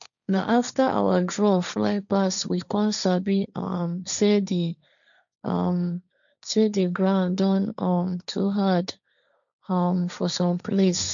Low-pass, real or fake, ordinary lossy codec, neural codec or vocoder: 7.2 kHz; fake; none; codec, 16 kHz, 1.1 kbps, Voila-Tokenizer